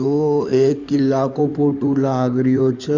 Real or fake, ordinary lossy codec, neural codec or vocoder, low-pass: fake; none; codec, 16 kHz in and 24 kHz out, 2.2 kbps, FireRedTTS-2 codec; 7.2 kHz